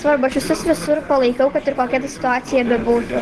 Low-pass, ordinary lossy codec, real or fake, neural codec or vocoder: 10.8 kHz; Opus, 16 kbps; real; none